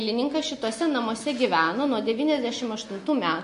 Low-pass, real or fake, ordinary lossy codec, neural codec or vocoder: 10.8 kHz; real; MP3, 48 kbps; none